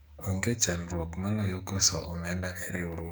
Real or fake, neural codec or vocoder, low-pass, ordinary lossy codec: fake; codec, 44.1 kHz, 2.6 kbps, SNAC; none; none